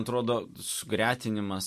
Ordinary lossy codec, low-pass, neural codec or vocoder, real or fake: MP3, 64 kbps; 14.4 kHz; none; real